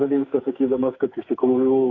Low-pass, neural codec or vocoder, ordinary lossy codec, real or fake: 7.2 kHz; codec, 44.1 kHz, 2.6 kbps, SNAC; AAC, 32 kbps; fake